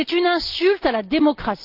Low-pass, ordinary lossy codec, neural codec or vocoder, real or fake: 5.4 kHz; Opus, 32 kbps; none; real